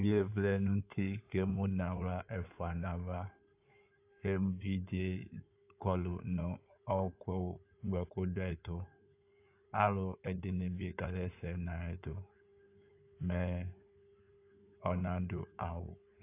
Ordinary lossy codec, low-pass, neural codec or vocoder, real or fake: AAC, 24 kbps; 3.6 kHz; codec, 16 kHz in and 24 kHz out, 2.2 kbps, FireRedTTS-2 codec; fake